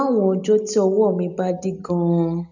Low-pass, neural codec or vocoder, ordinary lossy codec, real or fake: 7.2 kHz; none; none; real